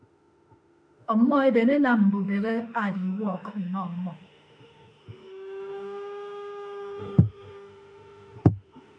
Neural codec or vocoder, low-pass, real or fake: autoencoder, 48 kHz, 32 numbers a frame, DAC-VAE, trained on Japanese speech; 9.9 kHz; fake